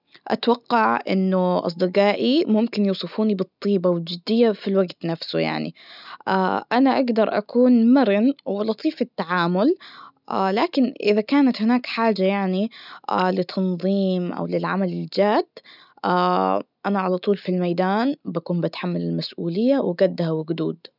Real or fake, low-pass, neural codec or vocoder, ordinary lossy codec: real; 5.4 kHz; none; none